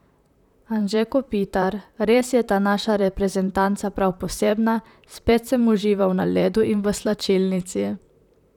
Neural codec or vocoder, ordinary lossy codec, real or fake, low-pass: vocoder, 44.1 kHz, 128 mel bands, Pupu-Vocoder; none; fake; 19.8 kHz